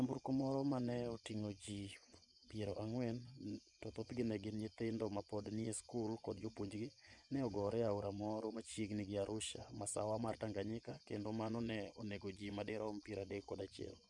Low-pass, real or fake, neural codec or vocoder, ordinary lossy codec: 10.8 kHz; fake; vocoder, 24 kHz, 100 mel bands, Vocos; none